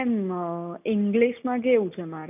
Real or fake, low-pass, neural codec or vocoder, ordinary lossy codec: real; 3.6 kHz; none; none